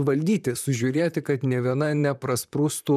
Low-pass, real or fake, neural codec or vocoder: 14.4 kHz; fake; vocoder, 44.1 kHz, 128 mel bands, Pupu-Vocoder